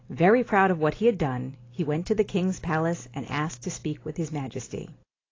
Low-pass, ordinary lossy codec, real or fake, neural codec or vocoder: 7.2 kHz; AAC, 32 kbps; real; none